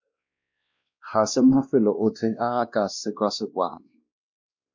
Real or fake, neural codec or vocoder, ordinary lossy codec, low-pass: fake; codec, 16 kHz, 1 kbps, X-Codec, WavLM features, trained on Multilingual LibriSpeech; MP3, 64 kbps; 7.2 kHz